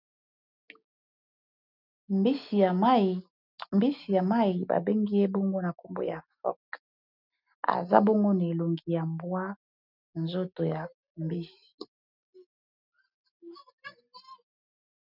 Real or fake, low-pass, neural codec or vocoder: real; 5.4 kHz; none